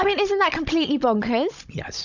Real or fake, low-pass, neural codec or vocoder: fake; 7.2 kHz; codec, 16 kHz, 16 kbps, FunCodec, trained on Chinese and English, 50 frames a second